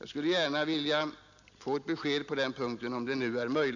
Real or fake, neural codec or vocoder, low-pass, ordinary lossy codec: real; none; 7.2 kHz; none